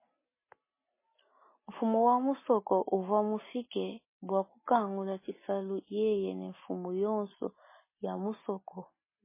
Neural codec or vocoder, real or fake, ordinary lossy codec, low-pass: none; real; MP3, 16 kbps; 3.6 kHz